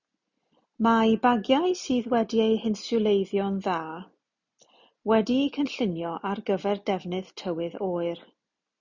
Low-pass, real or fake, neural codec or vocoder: 7.2 kHz; real; none